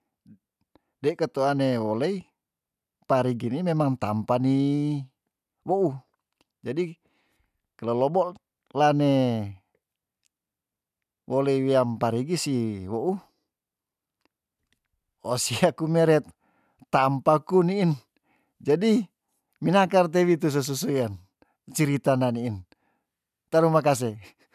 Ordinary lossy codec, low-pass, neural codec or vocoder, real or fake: none; 14.4 kHz; none; real